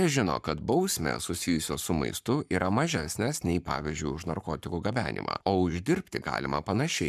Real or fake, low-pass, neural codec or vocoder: fake; 14.4 kHz; codec, 44.1 kHz, 7.8 kbps, Pupu-Codec